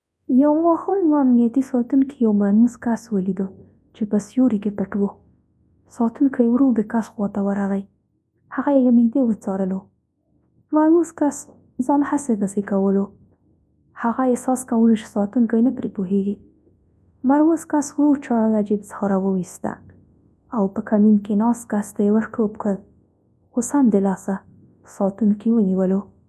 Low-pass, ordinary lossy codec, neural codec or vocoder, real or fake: none; none; codec, 24 kHz, 0.9 kbps, WavTokenizer, large speech release; fake